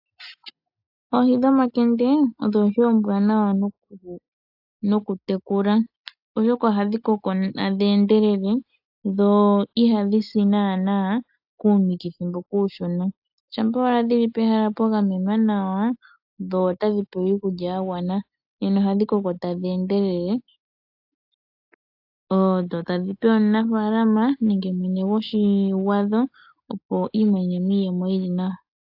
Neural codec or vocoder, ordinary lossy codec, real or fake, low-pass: none; AAC, 48 kbps; real; 5.4 kHz